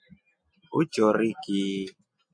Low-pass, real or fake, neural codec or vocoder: 9.9 kHz; real; none